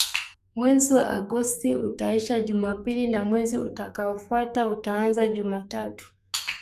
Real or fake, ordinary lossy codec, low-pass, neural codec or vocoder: fake; none; 14.4 kHz; codec, 32 kHz, 1.9 kbps, SNAC